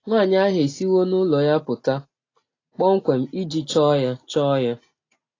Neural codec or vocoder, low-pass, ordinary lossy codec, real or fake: none; 7.2 kHz; AAC, 32 kbps; real